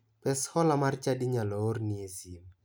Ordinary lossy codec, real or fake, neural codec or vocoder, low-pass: none; real; none; none